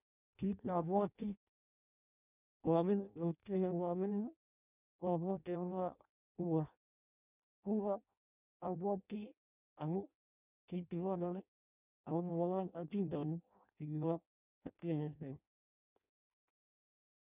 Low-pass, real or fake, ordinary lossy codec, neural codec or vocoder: 3.6 kHz; fake; none; codec, 16 kHz in and 24 kHz out, 0.6 kbps, FireRedTTS-2 codec